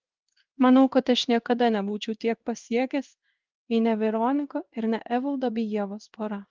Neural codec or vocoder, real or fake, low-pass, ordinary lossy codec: codec, 16 kHz in and 24 kHz out, 1 kbps, XY-Tokenizer; fake; 7.2 kHz; Opus, 32 kbps